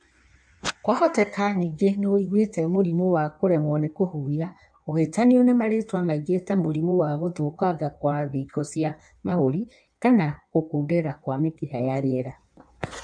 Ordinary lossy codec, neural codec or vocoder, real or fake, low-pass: none; codec, 16 kHz in and 24 kHz out, 1.1 kbps, FireRedTTS-2 codec; fake; 9.9 kHz